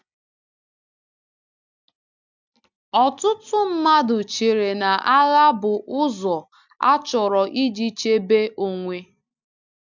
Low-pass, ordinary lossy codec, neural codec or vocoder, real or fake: 7.2 kHz; none; none; real